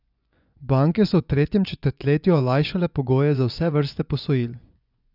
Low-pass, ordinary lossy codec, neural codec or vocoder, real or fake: 5.4 kHz; none; vocoder, 24 kHz, 100 mel bands, Vocos; fake